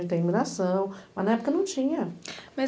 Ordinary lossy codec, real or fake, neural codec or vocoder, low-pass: none; real; none; none